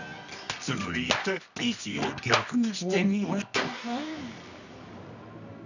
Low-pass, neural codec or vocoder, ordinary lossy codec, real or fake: 7.2 kHz; codec, 24 kHz, 0.9 kbps, WavTokenizer, medium music audio release; none; fake